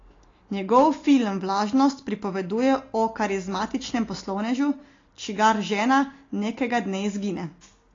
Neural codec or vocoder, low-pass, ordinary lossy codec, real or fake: none; 7.2 kHz; AAC, 32 kbps; real